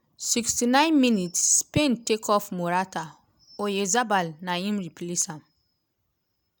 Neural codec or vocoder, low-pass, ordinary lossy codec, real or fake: none; none; none; real